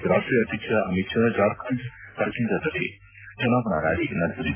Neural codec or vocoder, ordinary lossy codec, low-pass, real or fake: none; AAC, 24 kbps; 3.6 kHz; real